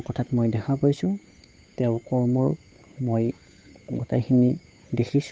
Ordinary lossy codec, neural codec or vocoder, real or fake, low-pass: none; codec, 16 kHz, 8 kbps, FunCodec, trained on Chinese and English, 25 frames a second; fake; none